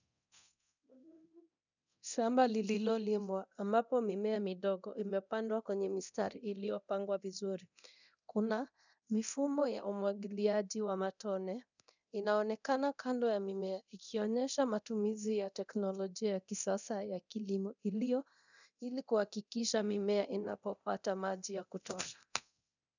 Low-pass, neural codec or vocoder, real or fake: 7.2 kHz; codec, 24 kHz, 0.9 kbps, DualCodec; fake